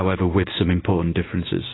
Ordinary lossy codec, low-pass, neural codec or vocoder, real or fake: AAC, 16 kbps; 7.2 kHz; none; real